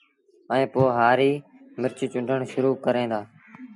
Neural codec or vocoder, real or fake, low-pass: none; real; 10.8 kHz